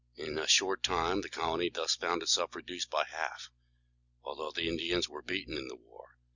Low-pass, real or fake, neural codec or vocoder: 7.2 kHz; real; none